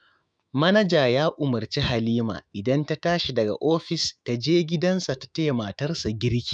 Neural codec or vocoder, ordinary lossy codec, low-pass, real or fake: autoencoder, 48 kHz, 128 numbers a frame, DAC-VAE, trained on Japanese speech; none; 9.9 kHz; fake